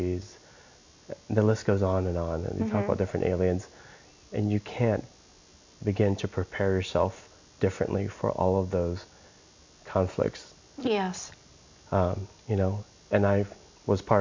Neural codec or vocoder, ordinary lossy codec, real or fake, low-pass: none; MP3, 48 kbps; real; 7.2 kHz